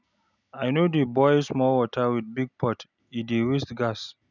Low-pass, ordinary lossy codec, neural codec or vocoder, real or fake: 7.2 kHz; none; none; real